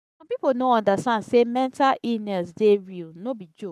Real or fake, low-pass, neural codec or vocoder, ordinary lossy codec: fake; 14.4 kHz; codec, 44.1 kHz, 7.8 kbps, Pupu-Codec; none